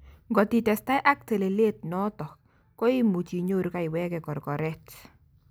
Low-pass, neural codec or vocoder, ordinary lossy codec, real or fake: none; none; none; real